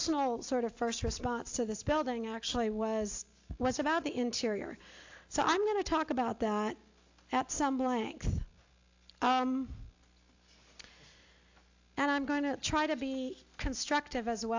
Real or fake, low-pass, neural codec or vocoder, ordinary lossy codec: real; 7.2 kHz; none; AAC, 48 kbps